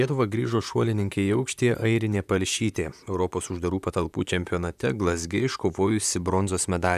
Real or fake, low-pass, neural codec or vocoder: fake; 14.4 kHz; vocoder, 44.1 kHz, 128 mel bands, Pupu-Vocoder